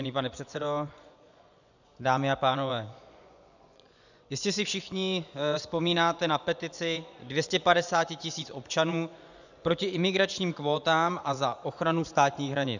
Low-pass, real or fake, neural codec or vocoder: 7.2 kHz; fake; vocoder, 24 kHz, 100 mel bands, Vocos